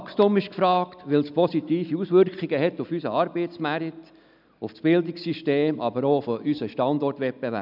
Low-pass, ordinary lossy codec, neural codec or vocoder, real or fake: 5.4 kHz; none; none; real